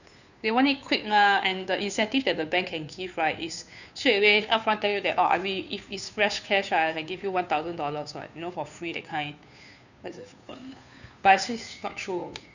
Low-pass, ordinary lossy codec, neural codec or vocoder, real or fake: 7.2 kHz; none; codec, 16 kHz, 2 kbps, FunCodec, trained on Chinese and English, 25 frames a second; fake